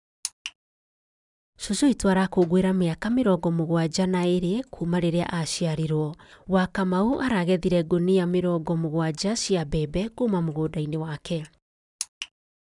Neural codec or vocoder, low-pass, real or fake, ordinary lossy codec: none; 10.8 kHz; real; none